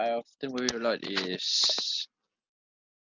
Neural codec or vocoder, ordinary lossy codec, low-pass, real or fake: none; none; 7.2 kHz; real